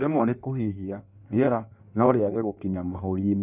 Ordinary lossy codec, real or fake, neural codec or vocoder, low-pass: none; fake; codec, 16 kHz in and 24 kHz out, 1.1 kbps, FireRedTTS-2 codec; 3.6 kHz